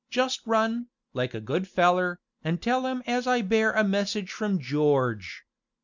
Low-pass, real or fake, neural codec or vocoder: 7.2 kHz; real; none